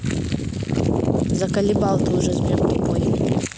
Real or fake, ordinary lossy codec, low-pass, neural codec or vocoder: real; none; none; none